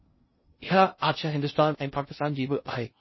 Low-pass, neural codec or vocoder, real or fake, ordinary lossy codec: 7.2 kHz; codec, 16 kHz in and 24 kHz out, 0.6 kbps, FocalCodec, streaming, 2048 codes; fake; MP3, 24 kbps